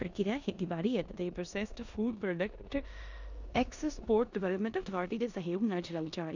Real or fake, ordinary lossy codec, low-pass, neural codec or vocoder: fake; none; 7.2 kHz; codec, 16 kHz in and 24 kHz out, 0.9 kbps, LongCat-Audio-Codec, four codebook decoder